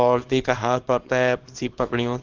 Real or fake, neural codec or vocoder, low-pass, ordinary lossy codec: fake; codec, 24 kHz, 0.9 kbps, WavTokenizer, small release; 7.2 kHz; Opus, 16 kbps